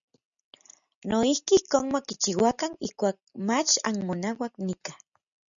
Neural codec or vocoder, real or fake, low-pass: none; real; 7.2 kHz